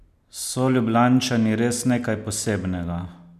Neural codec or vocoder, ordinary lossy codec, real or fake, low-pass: none; none; real; 14.4 kHz